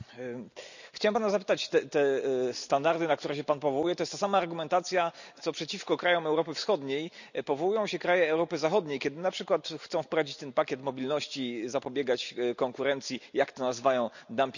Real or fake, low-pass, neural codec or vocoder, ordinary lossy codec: real; 7.2 kHz; none; none